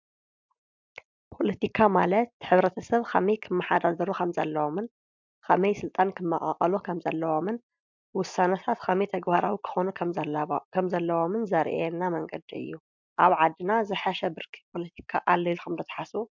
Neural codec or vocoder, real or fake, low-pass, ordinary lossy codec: none; real; 7.2 kHz; MP3, 64 kbps